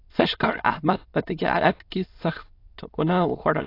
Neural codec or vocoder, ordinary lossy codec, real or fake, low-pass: autoencoder, 22.05 kHz, a latent of 192 numbers a frame, VITS, trained on many speakers; AAC, 32 kbps; fake; 5.4 kHz